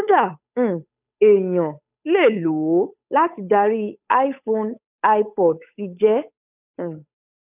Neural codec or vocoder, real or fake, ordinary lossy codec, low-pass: codec, 16 kHz, 8 kbps, FunCodec, trained on Chinese and English, 25 frames a second; fake; none; 3.6 kHz